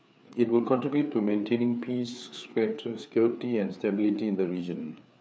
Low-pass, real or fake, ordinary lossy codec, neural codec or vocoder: none; fake; none; codec, 16 kHz, 4 kbps, FreqCodec, larger model